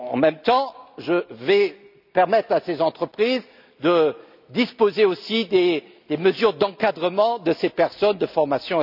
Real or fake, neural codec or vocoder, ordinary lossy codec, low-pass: real; none; none; 5.4 kHz